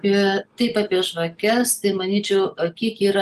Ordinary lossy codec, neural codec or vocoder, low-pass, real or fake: Opus, 32 kbps; none; 14.4 kHz; real